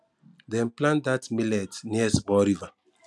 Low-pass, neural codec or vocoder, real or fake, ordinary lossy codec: none; none; real; none